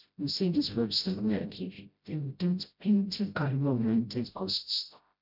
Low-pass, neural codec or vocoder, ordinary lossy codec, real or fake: 5.4 kHz; codec, 16 kHz, 0.5 kbps, FreqCodec, smaller model; none; fake